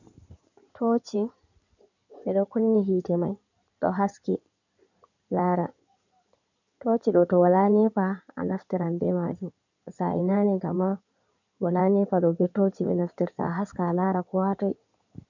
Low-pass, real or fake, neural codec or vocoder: 7.2 kHz; fake; codec, 16 kHz in and 24 kHz out, 2.2 kbps, FireRedTTS-2 codec